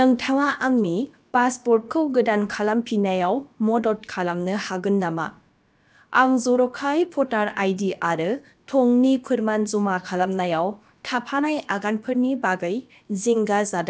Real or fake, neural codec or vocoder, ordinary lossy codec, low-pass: fake; codec, 16 kHz, about 1 kbps, DyCAST, with the encoder's durations; none; none